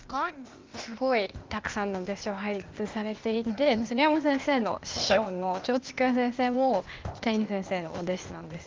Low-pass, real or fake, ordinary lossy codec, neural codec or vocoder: 7.2 kHz; fake; Opus, 32 kbps; codec, 16 kHz, 0.8 kbps, ZipCodec